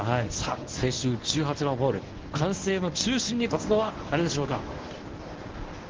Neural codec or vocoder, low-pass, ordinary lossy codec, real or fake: codec, 24 kHz, 0.9 kbps, WavTokenizer, medium speech release version 1; 7.2 kHz; Opus, 16 kbps; fake